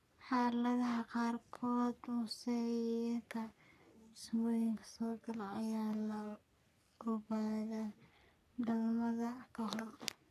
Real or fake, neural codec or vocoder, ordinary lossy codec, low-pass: fake; codec, 44.1 kHz, 3.4 kbps, Pupu-Codec; none; 14.4 kHz